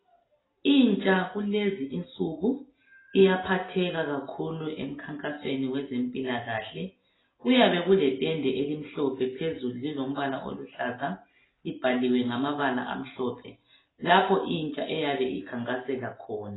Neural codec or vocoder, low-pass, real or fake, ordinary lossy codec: none; 7.2 kHz; real; AAC, 16 kbps